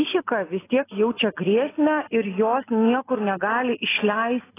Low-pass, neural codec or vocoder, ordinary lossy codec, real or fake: 3.6 kHz; none; AAC, 16 kbps; real